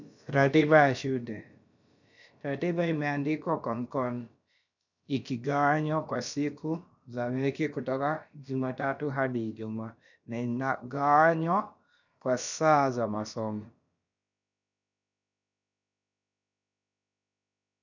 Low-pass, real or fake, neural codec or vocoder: 7.2 kHz; fake; codec, 16 kHz, about 1 kbps, DyCAST, with the encoder's durations